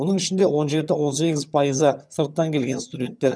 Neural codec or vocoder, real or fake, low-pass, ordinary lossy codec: vocoder, 22.05 kHz, 80 mel bands, HiFi-GAN; fake; none; none